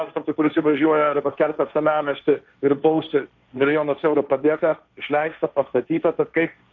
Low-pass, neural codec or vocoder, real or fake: 7.2 kHz; codec, 16 kHz, 1.1 kbps, Voila-Tokenizer; fake